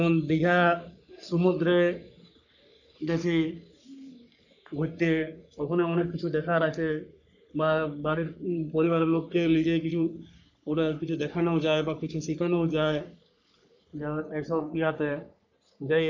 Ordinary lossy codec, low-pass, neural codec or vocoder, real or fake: none; 7.2 kHz; codec, 44.1 kHz, 3.4 kbps, Pupu-Codec; fake